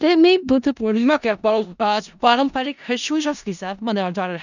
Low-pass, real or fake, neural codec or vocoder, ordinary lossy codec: 7.2 kHz; fake; codec, 16 kHz in and 24 kHz out, 0.4 kbps, LongCat-Audio-Codec, four codebook decoder; none